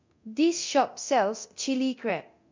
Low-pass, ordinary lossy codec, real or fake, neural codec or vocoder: 7.2 kHz; MP3, 48 kbps; fake; codec, 24 kHz, 0.9 kbps, DualCodec